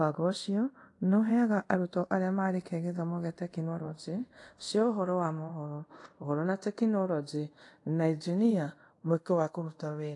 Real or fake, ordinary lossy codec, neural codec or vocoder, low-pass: fake; AAC, 48 kbps; codec, 24 kHz, 0.5 kbps, DualCodec; 10.8 kHz